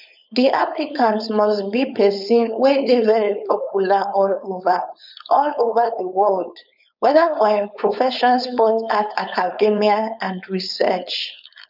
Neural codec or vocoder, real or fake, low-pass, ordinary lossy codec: codec, 16 kHz, 4.8 kbps, FACodec; fake; 5.4 kHz; none